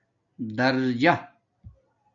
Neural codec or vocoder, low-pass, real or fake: none; 7.2 kHz; real